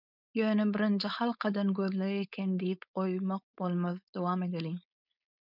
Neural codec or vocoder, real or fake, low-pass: codec, 16 kHz, 4.8 kbps, FACodec; fake; 5.4 kHz